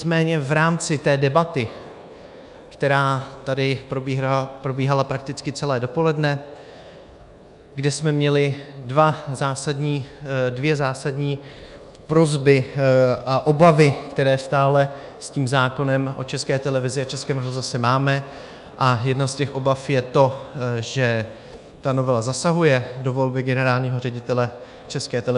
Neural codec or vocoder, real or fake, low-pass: codec, 24 kHz, 1.2 kbps, DualCodec; fake; 10.8 kHz